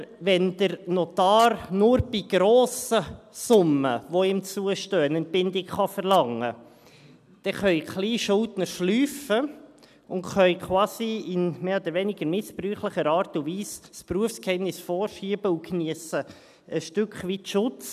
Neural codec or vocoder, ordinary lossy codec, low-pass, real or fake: none; none; 14.4 kHz; real